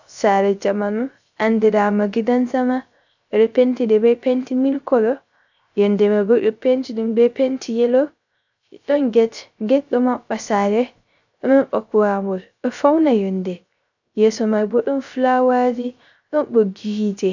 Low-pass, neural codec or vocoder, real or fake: 7.2 kHz; codec, 16 kHz, 0.3 kbps, FocalCodec; fake